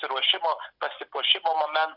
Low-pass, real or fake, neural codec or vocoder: 5.4 kHz; real; none